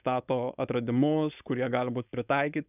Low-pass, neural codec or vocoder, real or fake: 3.6 kHz; codec, 16 kHz, 4.8 kbps, FACodec; fake